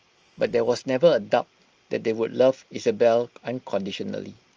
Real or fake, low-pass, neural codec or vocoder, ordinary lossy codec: real; 7.2 kHz; none; Opus, 24 kbps